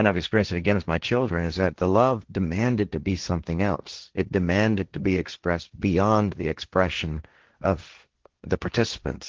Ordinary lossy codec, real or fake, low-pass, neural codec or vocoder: Opus, 16 kbps; fake; 7.2 kHz; codec, 16 kHz, 1.1 kbps, Voila-Tokenizer